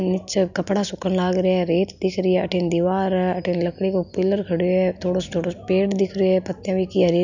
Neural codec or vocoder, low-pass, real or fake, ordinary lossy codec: none; 7.2 kHz; real; none